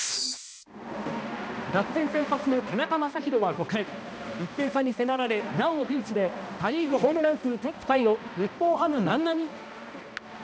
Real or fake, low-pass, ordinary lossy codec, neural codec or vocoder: fake; none; none; codec, 16 kHz, 1 kbps, X-Codec, HuBERT features, trained on balanced general audio